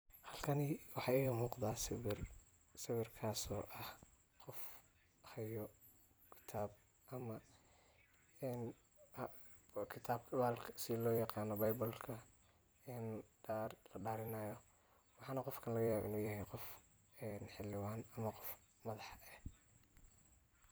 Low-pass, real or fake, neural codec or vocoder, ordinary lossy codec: none; fake; vocoder, 44.1 kHz, 128 mel bands every 256 samples, BigVGAN v2; none